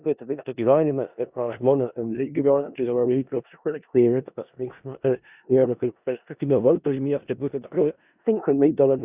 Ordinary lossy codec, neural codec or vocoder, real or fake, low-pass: Opus, 64 kbps; codec, 16 kHz in and 24 kHz out, 0.4 kbps, LongCat-Audio-Codec, four codebook decoder; fake; 3.6 kHz